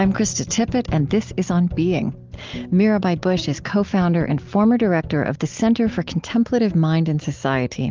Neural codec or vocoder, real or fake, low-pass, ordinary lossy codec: none; real; 7.2 kHz; Opus, 32 kbps